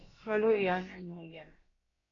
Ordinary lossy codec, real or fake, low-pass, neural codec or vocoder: AAC, 32 kbps; fake; 7.2 kHz; codec, 16 kHz, about 1 kbps, DyCAST, with the encoder's durations